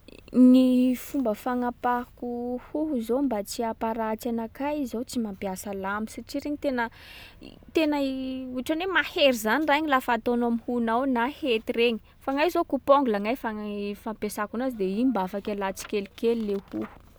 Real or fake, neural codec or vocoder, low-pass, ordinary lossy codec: real; none; none; none